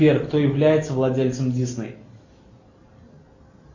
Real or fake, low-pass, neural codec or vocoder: real; 7.2 kHz; none